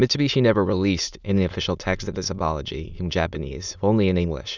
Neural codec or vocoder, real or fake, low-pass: autoencoder, 22.05 kHz, a latent of 192 numbers a frame, VITS, trained on many speakers; fake; 7.2 kHz